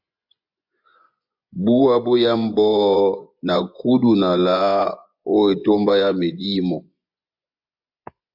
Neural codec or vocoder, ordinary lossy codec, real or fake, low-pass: vocoder, 24 kHz, 100 mel bands, Vocos; AAC, 48 kbps; fake; 5.4 kHz